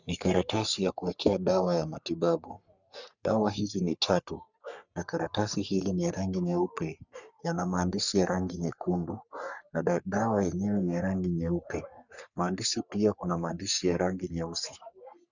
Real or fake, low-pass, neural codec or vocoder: fake; 7.2 kHz; codec, 44.1 kHz, 3.4 kbps, Pupu-Codec